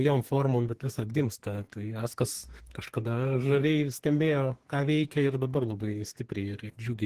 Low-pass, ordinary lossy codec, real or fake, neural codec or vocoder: 14.4 kHz; Opus, 16 kbps; fake; codec, 32 kHz, 1.9 kbps, SNAC